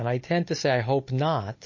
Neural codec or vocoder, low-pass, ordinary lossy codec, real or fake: none; 7.2 kHz; MP3, 32 kbps; real